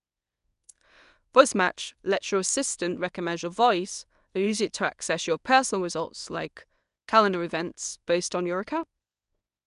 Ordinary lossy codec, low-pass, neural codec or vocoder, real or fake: none; 10.8 kHz; codec, 24 kHz, 0.9 kbps, WavTokenizer, medium speech release version 1; fake